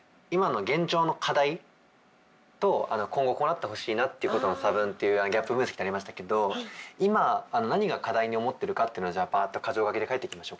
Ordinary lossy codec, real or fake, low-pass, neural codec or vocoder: none; real; none; none